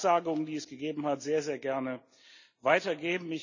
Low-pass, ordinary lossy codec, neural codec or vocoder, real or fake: 7.2 kHz; none; none; real